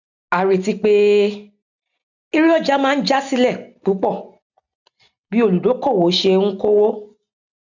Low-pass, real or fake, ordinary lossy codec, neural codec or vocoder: 7.2 kHz; real; none; none